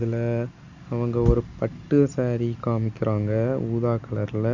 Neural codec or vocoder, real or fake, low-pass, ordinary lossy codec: none; real; 7.2 kHz; Opus, 64 kbps